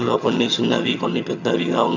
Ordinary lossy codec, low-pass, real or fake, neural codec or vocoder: none; 7.2 kHz; fake; vocoder, 22.05 kHz, 80 mel bands, HiFi-GAN